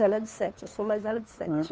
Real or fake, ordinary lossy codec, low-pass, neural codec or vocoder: fake; none; none; codec, 16 kHz, 2 kbps, FunCodec, trained on Chinese and English, 25 frames a second